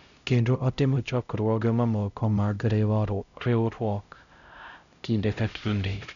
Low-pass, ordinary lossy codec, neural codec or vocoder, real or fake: 7.2 kHz; none; codec, 16 kHz, 0.5 kbps, X-Codec, HuBERT features, trained on LibriSpeech; fake